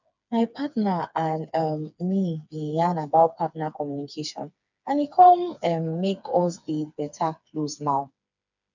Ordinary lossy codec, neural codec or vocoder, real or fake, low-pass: none; codec, 16 kHz, 4 kbps, FreqCodec, smaller model; fake; 7.2 kHz